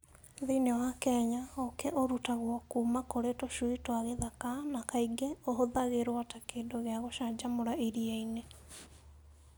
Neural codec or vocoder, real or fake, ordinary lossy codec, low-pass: none; real; none; none